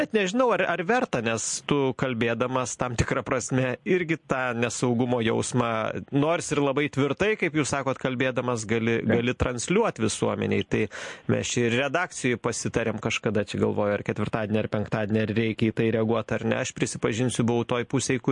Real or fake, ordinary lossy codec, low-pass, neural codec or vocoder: real; MP3, 48 kbps; 10.8 kHz; none